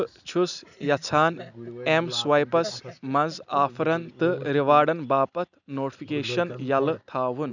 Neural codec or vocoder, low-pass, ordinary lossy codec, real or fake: none; 7.2 kHz; none; real